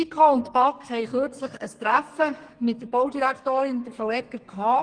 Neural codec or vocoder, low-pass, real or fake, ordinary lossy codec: codec, 32 kHz, 1.9 kbps, SNAC; 9.9 kHz; fake; Opus, 24 kbps